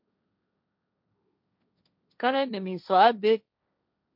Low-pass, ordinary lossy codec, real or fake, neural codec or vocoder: 5.4 kHz; MP3, 48 kbps; fake; codec, 16 kHz, 1.1 kbps, Voila-Tokenizer